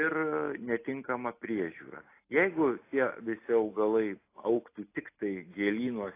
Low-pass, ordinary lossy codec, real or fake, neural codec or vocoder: 3.6 kHz; AAC, 24 kbps; real; none